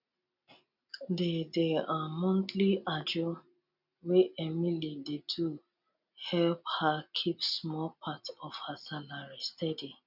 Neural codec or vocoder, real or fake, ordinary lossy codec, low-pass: none; real; none; 5.4 kHz